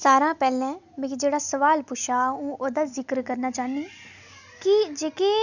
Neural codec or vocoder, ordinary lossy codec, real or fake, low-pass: none; none; real; 7.2 kHz